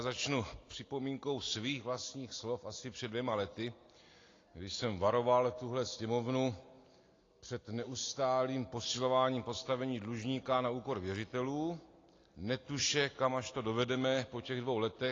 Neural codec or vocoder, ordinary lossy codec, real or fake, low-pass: none; AAC, 32 kbps; real; 7.2 kHz